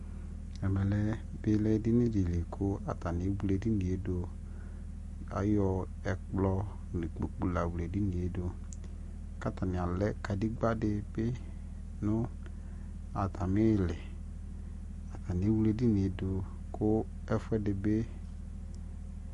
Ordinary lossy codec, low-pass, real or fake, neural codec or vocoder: MP3, 48 kbps; 14.4 kHz; real; none